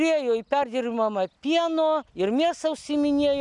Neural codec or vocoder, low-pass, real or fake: none; 10.8 kHz; real